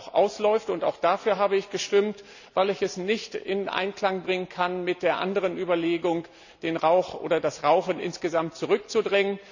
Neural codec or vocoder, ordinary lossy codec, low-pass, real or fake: none; none; 7.2 kHz; real